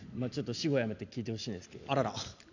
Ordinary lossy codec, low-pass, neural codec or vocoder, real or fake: none; 7.2 kHz; none; real